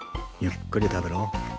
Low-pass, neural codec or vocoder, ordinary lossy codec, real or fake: none; none; none; real